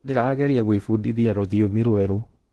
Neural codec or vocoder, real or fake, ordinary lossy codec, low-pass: codec, 16 kHz in and 24 kHz out, 0.8 kbps, FocalCodec, streaming, 65536 codes; fake; Opus, 16 kbps; 10.8 kHz